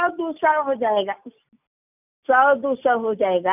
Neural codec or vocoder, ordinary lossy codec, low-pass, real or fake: none; none; 3.6 kHz; real